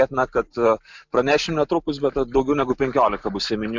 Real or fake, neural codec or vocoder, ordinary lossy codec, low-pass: real; none; MP3, 64 kbps; 7.2 kHz